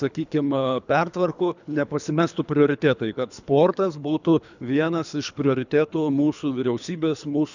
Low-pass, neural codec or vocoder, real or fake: 7.2 kHz; codec, 24 kHz, 3 kbps, HILCodec; fake